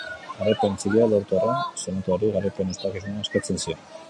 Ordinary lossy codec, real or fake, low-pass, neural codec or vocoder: MP3, 64 kbps; real; 10.8 kHz; none